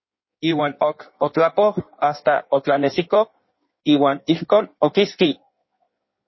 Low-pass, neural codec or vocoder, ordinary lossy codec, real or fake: 7.2 kHz; codec, 16 kHz in and 24 kHz out, 1.1 kbps, FireRedTTS-2 codec; MP3, 24 kbps; fake